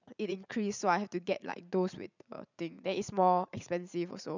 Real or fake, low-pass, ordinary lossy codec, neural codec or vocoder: fake; 7.2 kHz; none; vocoder, 44.1 kHz, 80 mel bands, Vocos